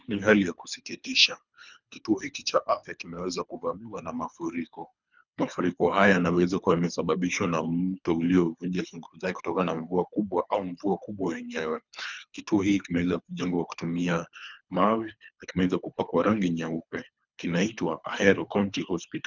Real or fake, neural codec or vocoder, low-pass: fake; codec, 24 kHz, 3 kbps, HILCodec; 7.2 kHz